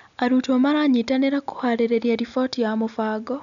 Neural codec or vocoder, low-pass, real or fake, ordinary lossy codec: none; 7.2 kHz; real; none